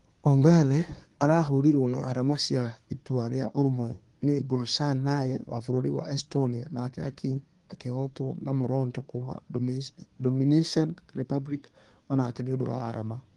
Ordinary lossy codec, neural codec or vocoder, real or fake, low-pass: Opus, 24 kbps; codec, 24 kHz, 1 kbps, SNAC; fake; 10.8 kHz